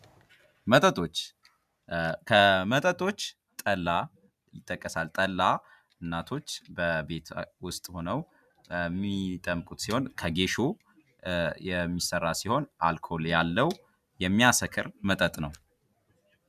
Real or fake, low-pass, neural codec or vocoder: real; 14.4 kHz; none